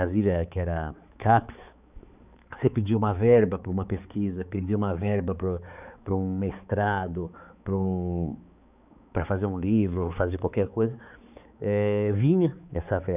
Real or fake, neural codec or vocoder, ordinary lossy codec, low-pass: fake; codec, 16 kHz, 4 kbps, X-Codec, HuBERT features, trained on balanced general audio; none; 3.6 kHz